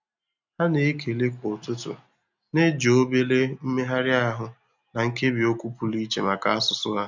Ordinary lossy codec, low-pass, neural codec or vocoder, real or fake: none; 7.2 kHz; none; real